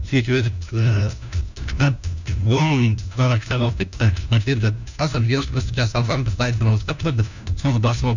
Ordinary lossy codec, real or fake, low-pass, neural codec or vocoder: none; fake; 7.2 kHz; codec, 16 kHz, 1 kbps, FunCodec, trained on LibriTTS, 50 frames a second